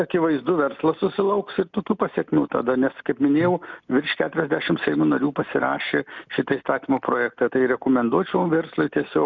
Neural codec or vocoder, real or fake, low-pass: none; real; 7.2 kHz